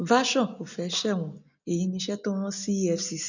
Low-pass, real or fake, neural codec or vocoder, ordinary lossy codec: 7.2 kHz; real; none; none